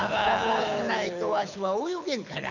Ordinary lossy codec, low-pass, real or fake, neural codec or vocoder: none; 7.2 kHz; fake; codec, 24 kHz, 6 kbps, HILCodec